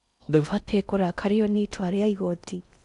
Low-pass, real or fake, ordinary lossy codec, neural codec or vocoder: 10.8 kHz; fake; none; codec, 16 kHz in and 24 kHz out, 0.6 kbps, FocalCodec, streaming, 4096 codes